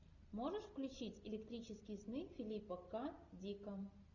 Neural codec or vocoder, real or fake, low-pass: none; real; 7.2 kHz